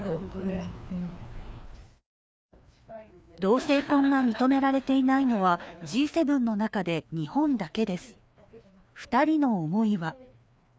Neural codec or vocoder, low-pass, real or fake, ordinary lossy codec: codec, 16 kHz, 2 kbps, FreqCodec, larger model; none; fake; none